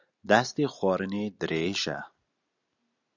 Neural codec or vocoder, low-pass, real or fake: none; 7.2 kHz; real